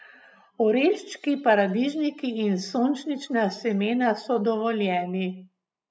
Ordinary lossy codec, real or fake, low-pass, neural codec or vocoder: none; real; none; none